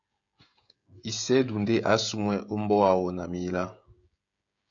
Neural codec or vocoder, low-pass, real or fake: codec, 16 kHz, 16 kbps, FreqCodec, smaller model; 7.2 kHz; fake